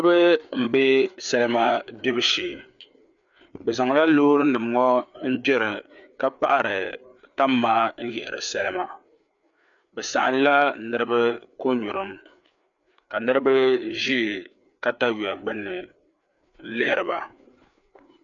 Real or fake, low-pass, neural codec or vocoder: fake; 7.2 kHz; codec, 16 kHz, 4 kbps, FreqCodec, larger model